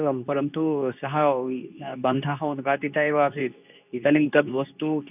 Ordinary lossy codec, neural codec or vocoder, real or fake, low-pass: none; codec, 24 kHz, 0.9 kbps, WavTokenizer, medium speech release version 2; fake; 3.6 kHz